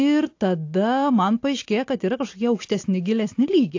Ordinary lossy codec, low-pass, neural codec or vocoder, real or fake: AAC, 48 kbps; 7.2 kHz; none; real